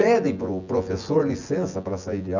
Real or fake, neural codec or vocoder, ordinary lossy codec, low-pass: fake; vocoder, 24 kHz, 100 mel bands, Vocos; none; 7.2 kHz